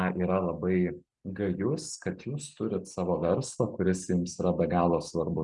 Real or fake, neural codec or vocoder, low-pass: real; none; 10.8 kHz